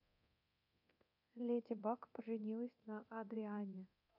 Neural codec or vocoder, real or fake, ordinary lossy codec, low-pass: codec, 24 kHz, 0.9 kbps, DualCodec; fake; none; 5.4 kHz